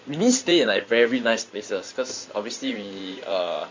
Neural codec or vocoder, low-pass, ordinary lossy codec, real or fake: codec, 16 kHz in and 24 kHz out, 2.2 kbps, FireRedTTS-2 codec; 7.2 kHz; MP3, 48 kbps; fake